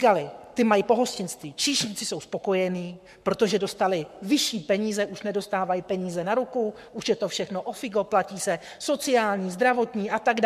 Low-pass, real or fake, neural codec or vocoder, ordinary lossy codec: 14.4 kHz; fake; codec, 44.1 kHz, 7.8 kbps, Pupu-Codec; MP3, 96 kbps